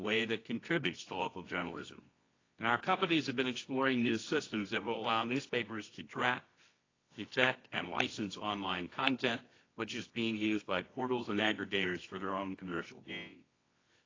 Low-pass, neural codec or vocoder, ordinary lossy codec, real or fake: 7.2 kHz; codec, 24 kHz, 0.9 kbps, WavTokenizer, medium music audio release; AAC, 32 kbps; fake